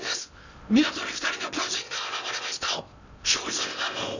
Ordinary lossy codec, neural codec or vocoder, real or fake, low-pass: none; codec, 16 kHz in and 24 kHz out, 0.6 kbps, FocalCodec, streaming, 2048 codes; fake; 7.2 kHz